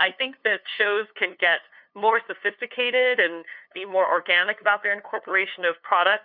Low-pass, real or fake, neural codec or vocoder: 5.4 kHz; fake; codec, 16 kHz, 2 kbps, FunCodec, trained on LibriTTS, 25 frames a second